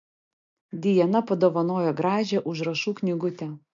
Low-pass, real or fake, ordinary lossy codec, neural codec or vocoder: 7.2 kHz; real; MP3, 48 kbps; none